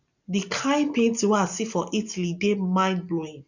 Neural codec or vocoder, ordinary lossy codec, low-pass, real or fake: none; none; 7.2 kHz; real